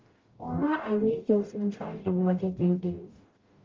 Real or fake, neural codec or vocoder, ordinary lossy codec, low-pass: fake; codec, 44.1 kHz, 0.9 kbps, DAC; Opus, 32 kbps; 7.2 kHz